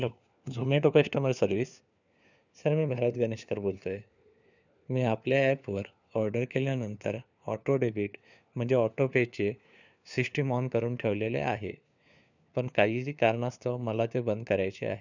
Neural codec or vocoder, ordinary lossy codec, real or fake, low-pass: codec, 16 kHz, 4 kbps, FunCodec, trained on LibriTTS, 50 frames a second; none; fake; 7.2 kHz